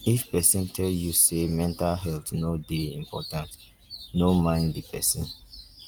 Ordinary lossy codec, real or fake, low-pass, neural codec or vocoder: Opus, 32 kbps; real; 19.8 kHz; none